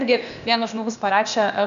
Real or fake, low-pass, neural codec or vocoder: fake; 7.2 kHz; codec, 16 kHz, 0.8 kbps, ZipCodec